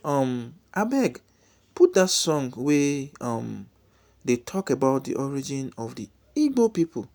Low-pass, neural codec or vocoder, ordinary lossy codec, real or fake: none; none; none; real